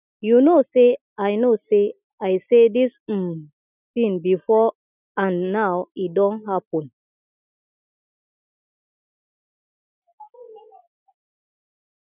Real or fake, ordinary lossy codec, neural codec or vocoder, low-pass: real; none; none; 3.6 kHz